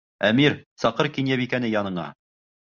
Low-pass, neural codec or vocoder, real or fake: 7.2 kHz; none; real